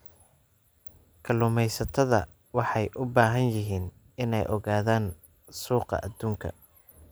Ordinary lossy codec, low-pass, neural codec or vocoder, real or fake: none; none; none; real